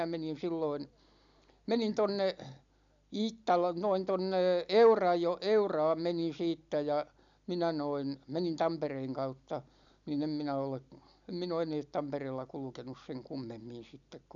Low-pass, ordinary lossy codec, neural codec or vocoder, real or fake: 7.2 kHz; none; none; real